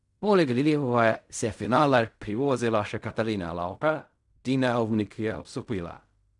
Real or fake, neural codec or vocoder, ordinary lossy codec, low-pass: fake; codec, 16 kHz in and 24 kHz out, 0.4 kbps, LongCat-Audio-Codec, fine tuned four codebook decoder; none; 10.8 kHz